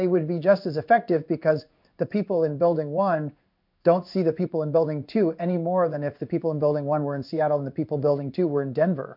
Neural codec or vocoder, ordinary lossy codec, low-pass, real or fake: codec, 16 kHz in and 24 kHz out, 1 kbps, XY-Tokenizer; MP3, 48 kbps; 5.4 kHz; fake